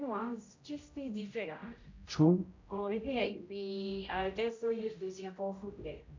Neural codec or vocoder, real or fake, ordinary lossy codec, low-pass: codec, 16 kHz, 0.5 kbps, X-Codec, HuBERT features, trained on general audio; fake; MP3, 64 kbps; 7.2 kHz